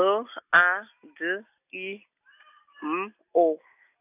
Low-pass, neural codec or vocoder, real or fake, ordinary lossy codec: 3.6 kHz; none; real; none